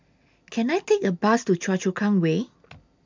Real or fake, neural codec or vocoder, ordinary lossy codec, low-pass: real; none; MP3, 64 kbps; 7.2 kHz